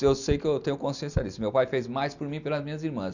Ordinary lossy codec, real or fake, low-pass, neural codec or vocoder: none; real; 7.2 kHz; none